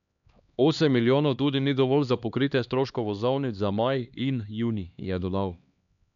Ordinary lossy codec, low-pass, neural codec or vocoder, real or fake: none; 7.2 kHz; codec, 16 kHz, 2 kbps, X-Codec, HuBERT features, trained on LibriSpeech; fake